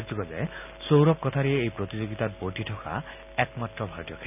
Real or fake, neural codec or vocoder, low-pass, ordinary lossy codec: real; none; 3.6 kHz; none